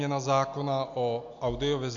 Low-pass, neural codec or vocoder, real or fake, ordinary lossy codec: 7.2 kHz; none; real; AAC, 64 kbps